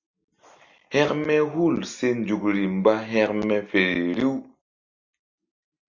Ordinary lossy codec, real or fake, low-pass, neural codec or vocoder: MP3, 64 kbps; real; 7.2 kHz; none